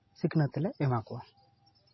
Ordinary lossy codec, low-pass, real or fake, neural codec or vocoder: MP3, 24 kbps; 7.2 kHz; real; none